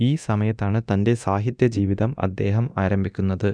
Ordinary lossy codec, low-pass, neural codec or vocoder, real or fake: none; 9.9 kHz; codec, 24 kHz, 0.9 kbps, DualCodec; fake